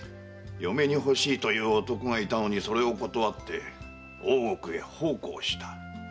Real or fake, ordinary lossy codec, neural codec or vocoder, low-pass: real; none; none; none